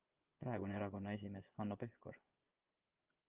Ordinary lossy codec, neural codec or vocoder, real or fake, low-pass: Opus, 24 kbps; vocoder, 24 kHz, 100 mel bands, Vocos; fake; 3.6 kHz